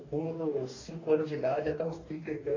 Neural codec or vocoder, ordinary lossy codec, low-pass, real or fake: codec, 44.1 kHz, 2.6 kbps, DAC; AAC, 32 kbps; 7.2 kHz; fake